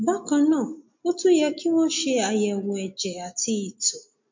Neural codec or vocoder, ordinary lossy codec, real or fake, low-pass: none; MP3, 48 kbps; real; 7.2 kHz